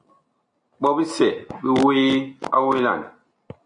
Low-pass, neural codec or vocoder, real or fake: 9.9 kHz; none; real